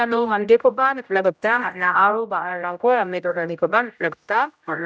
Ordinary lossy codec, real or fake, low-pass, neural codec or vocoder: none; fake; none; codec, 16 kHz, 0.5 kbps, X-Codec, HuBERT features, trained on general audio